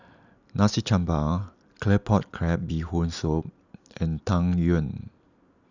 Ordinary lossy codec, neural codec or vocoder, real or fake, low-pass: none; none; real; 7.2 kHz